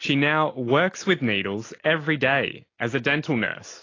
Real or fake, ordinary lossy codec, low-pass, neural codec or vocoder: real; AAC, 32 kbps; 7.2 kHz; none